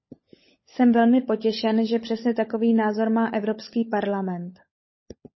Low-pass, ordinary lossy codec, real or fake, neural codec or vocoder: 7.2 kHz; MP3, 24 kbps; fake; codec, 16 kHz, 16 kbps, FunCodec, trained on LibriTTS, 50 frames a second